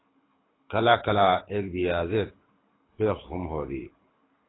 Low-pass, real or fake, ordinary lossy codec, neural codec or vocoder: 7.2 kHz; fake; AAC, 16 kbps; codec, 24 kHz, 6 kbps, HILCodec